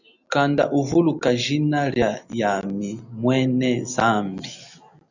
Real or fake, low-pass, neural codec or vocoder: real; 7.2 kHz; none